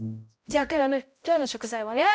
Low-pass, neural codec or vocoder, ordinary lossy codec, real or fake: none; codec, 16 kHz, 0.5 kbps, X-Codec, HuBERT features, trained on balanced general audio; none; fake